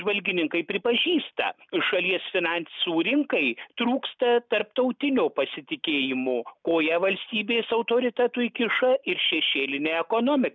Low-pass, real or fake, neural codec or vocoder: 7.2 kHz; real; none